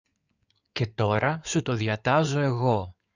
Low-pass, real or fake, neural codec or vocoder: 7.2 kHz; fake; codec, 16 kHz in and 24 kHz out, 2.2 kbps, FireRedTTS-2 codec